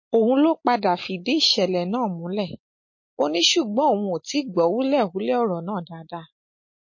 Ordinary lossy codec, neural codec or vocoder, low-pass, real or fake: MP3, 32 kbps; none; 7.2 kHz; real